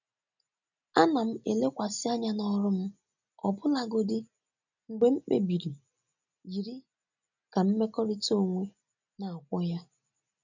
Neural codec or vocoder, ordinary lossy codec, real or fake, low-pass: none; none; real; 7.2 kHz